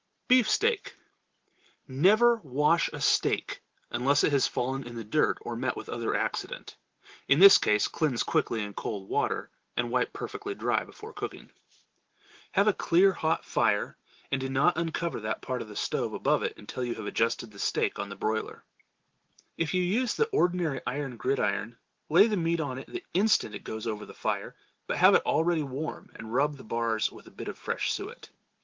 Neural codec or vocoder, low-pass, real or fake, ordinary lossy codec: none; 7.2 kHz; real; Opus, 16 kbps